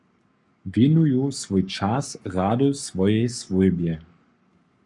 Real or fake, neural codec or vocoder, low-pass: fake; codec, 44.1 kHz, 7.8 kbps, Pupu-Codec; 10.8 kHz